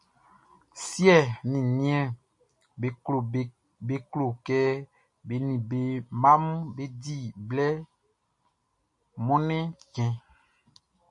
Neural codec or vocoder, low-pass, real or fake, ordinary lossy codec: none; 10.8 kHz; real; MP3, 48 kbps